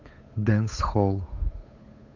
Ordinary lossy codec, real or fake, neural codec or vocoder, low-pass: AAC, 48 kbps; fake; codec, 16 kHz, 8 kbps, FunCodec, trained on Chinese and English, 25 frames a second; 7.2 kHz